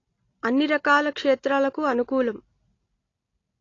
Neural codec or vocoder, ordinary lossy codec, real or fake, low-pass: none; AAC, 32 kbps; real; 7.2 kHz